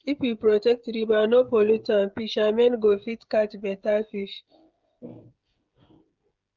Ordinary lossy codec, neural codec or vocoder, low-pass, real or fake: Opus, 24 kbps; codec, 16 kHz, 16 kbps, FreqCodec, smaller model; 7.2 kHz; fake